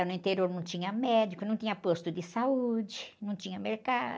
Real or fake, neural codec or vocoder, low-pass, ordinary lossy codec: real; none; none; none